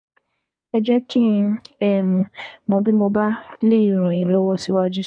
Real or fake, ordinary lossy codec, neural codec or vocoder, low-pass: fake; MP3, 64 kbps; codec, 24 kHz, 1 kbps, SNAC; 9.9 kHz